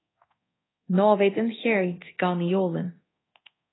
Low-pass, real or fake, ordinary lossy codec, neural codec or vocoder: 7.2 kHz; fake; AAC, 16 kbps; codec, 24 kHz, 0.9 kbps, DualCodec